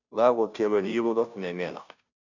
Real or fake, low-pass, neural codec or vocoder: fake; 7.2 kHz; codec, 16 kHz, 0.5 kbps, FunCodec, trained on Chinese and English, 25 frames a second